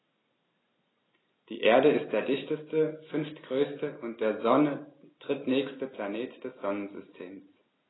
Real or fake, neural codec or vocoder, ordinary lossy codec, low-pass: real; none; AAC, 16 kbps; 7.2 kHz